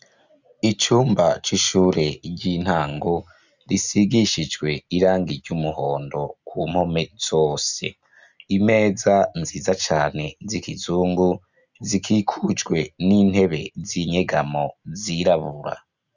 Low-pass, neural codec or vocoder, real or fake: 7.2 kHz; none; real